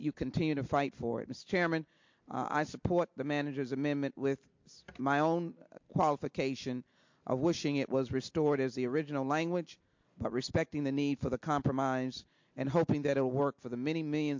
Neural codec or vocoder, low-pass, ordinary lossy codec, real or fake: none; 7.2 kHz; MP3, 48 kbps; real